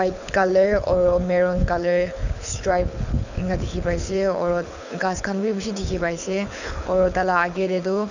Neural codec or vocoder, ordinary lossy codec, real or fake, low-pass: codec, 24 kHz, 6 kbps, HILCodec; none; fake; 7.2 kHz